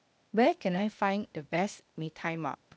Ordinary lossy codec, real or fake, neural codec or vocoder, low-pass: none; fake; codec, 16 kHz, 0.8 kbps, ZipCodec; none